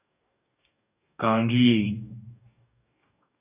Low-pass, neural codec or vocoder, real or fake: 3.6 kHz; codec, 44.1 kHz, 2.6 kbps, DAC; fake